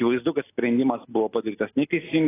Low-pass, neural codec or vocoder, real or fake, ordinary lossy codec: 3.6 kHz; none; real; AAC, 16 kbps